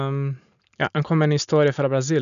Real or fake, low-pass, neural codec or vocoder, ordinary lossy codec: real; 7.2 kHz; none; none